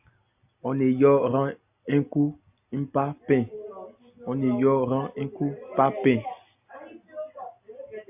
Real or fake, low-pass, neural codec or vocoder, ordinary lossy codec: real; 3.6 kHz; none; AAC, 32 kbps